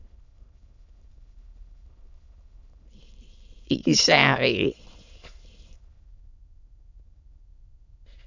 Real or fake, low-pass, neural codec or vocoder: fake; 7.2 kHz; autoencoder, 22.05 kHz, a latent of 192 numbers a frame, VITS, trained on many speakers